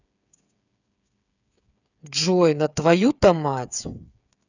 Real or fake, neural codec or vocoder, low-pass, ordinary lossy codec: fake; codec, 16 kHz, 8 kbps, FreqCodec, smaller model; 7.2 kHz; none